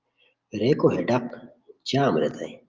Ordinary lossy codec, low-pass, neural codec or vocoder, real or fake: Opus, 24 kbps; 7.2 kHz; none; real